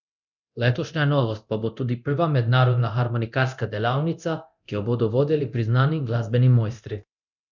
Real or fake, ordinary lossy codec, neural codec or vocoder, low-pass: fake; none; codec, 24 kHz, 0.9 kbps, DualCodec; 7.2 kHz